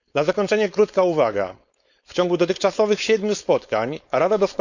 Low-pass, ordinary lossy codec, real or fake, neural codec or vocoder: 7.2 kHz; none; fake; codec, 16 kHz, 4.8 kbps, FACodec